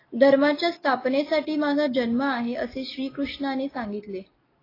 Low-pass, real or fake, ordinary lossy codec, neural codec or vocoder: 5.4 kHz; real; AAC, 24 kbps; none